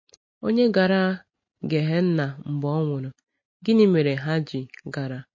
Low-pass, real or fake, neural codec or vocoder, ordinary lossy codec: 7.2 kHz; real; none; MP3, 32 kbps